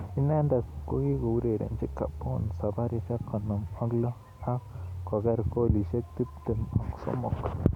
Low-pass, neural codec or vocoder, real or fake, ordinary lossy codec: 19.8 kHz; none; real; none